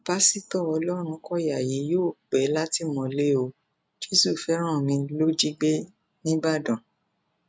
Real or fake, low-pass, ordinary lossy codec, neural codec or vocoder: real; none; none; none